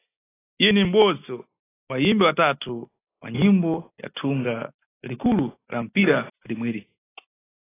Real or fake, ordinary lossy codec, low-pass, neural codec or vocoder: real; AAC, 16 kbps; 3.6 kHz; none